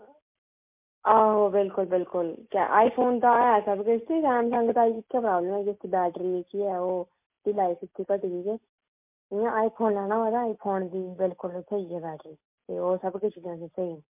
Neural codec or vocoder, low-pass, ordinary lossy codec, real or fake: none; 3.6 kHz; MP3, 32 kbps; real